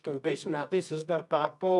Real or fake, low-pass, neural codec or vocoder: fake; 10.8 kHz; codec, 24 kHz, 0.9 kbps, WavTokenizer, medium music audio release